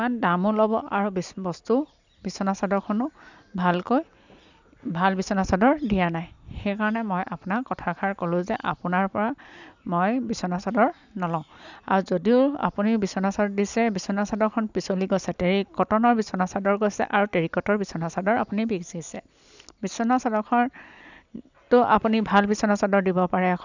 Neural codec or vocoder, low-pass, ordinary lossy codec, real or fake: none; 7.2 kHz; none; real